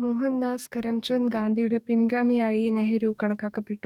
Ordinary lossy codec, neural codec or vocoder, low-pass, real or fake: none; codec, 44.1 kHz, 2.6 kbps, DAC; 19.8 kHz; fake